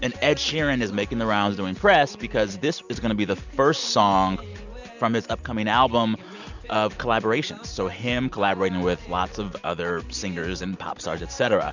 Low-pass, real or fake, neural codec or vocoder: 7.2 kHz; real; none